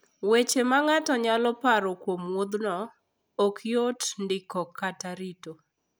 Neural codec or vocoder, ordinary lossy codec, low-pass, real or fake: none; none; none; real